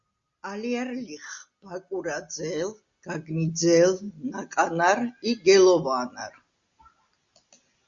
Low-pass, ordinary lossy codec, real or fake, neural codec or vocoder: 7.2 kHz; Opus, 64 kbps; real; none